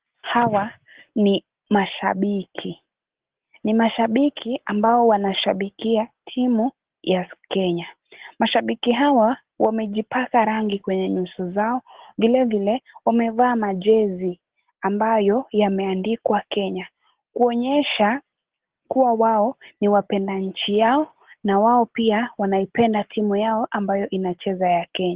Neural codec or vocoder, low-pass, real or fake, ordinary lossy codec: none; 3.6 kHz; real; Opus, 16 kbps